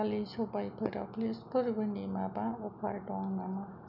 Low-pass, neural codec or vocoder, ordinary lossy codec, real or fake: 5.4 kHz; none; none; real